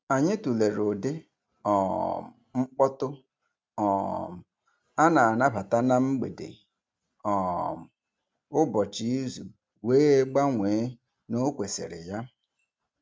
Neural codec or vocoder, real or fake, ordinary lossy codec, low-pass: none; real; none; none